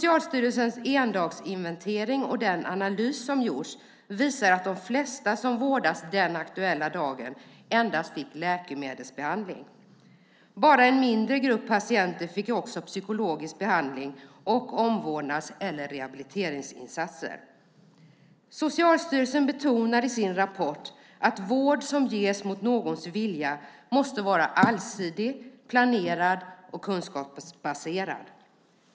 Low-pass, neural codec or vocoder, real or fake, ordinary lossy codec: none; none; real; none